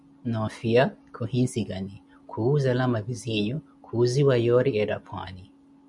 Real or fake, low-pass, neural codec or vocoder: real; 10.8 kHz; none